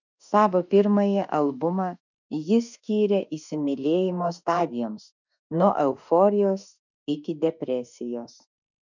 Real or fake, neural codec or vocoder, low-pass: fake; autoencoder, 48 kHz, 32 numbers a frame, DAC-VAE, trained on Japanese speech; 7.2 kHz